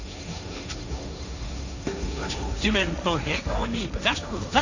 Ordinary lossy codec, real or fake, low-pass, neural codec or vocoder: none; fake; 7.2 kHz; codec, 16 kHz, 1.1 kbps, Voila-Tokenizer